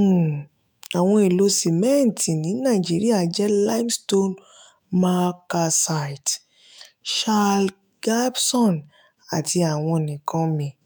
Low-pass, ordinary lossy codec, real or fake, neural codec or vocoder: none; none; fake; autoencoder, 48 kHz, 128 numbers a frame, DAC-VAE, trained on Japanese speech